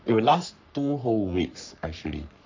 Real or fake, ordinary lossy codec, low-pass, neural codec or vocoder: fake; MP3, 64 kbps; 7.2 kHz; codec, 44.1 kHz, 3.4 kbps, Pupu-Codec